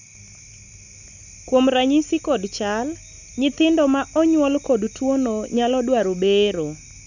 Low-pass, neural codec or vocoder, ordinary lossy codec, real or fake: 7.2 kHz; none; none; real